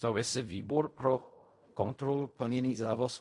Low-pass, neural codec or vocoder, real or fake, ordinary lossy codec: 10.8 kHz; codec, 16 kHz in and 24 kHz out, 0.4 kbps, LongCat-Audio-Codec, fine tuned four codebook decoder; fake; MP3, 48 kbps